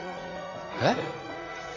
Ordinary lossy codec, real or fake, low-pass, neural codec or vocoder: none; fake; 7.2 kHz; vocoder, 44.1 kHz, 80 mel bands, Vocos